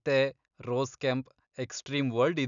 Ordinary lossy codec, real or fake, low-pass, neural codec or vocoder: none; real; 7.2 kHz; none